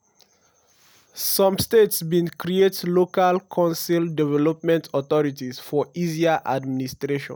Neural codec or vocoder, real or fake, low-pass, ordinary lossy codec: none; real; none; none